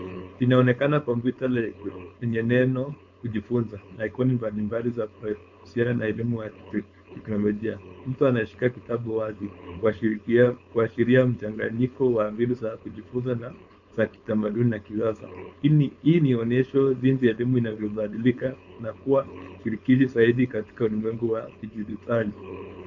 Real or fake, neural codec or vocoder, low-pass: fake; codec, 16 kHz, 4.8 kbps, FACodec; 7.2 kHz